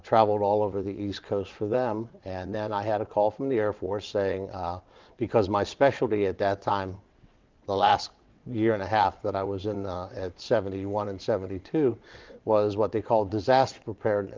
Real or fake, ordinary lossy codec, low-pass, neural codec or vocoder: fake; Opus, 24 kbps; 7.2 kHz; vocoder, 22.05 kHz, 80 mel bands, WaveNeXt